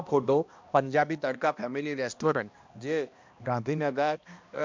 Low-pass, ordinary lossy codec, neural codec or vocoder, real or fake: 7.2 kHz; MP3, 64 kbps; codec, 16 kHz, 1 kbps, X-Codec, HuBERT features, trained on balanced general audio; fake